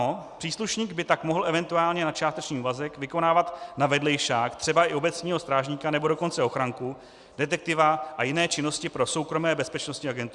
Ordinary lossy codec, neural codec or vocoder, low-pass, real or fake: Opus, 64 kbps; none; 10.8 kHz; real